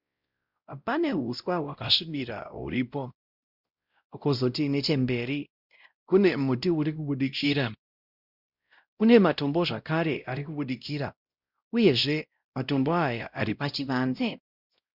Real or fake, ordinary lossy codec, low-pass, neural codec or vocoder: fake; Opus, 64 kbps; 5.4 kHz; codec, 16 kHz, 0.5 kbps, X-Codec, WavLM features, trained on Multilingual LibriSpeech